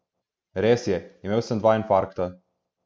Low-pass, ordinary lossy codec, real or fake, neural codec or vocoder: none; none; real; none